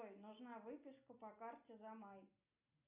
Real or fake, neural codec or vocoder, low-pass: real; none; 3.6 kHz